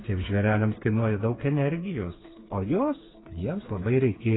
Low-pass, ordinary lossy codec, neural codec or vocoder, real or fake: 7.2 kHz; AAC, 16 kbps; codec, 16 kHz, 4 kbps, FreqCodec, smaller model; fake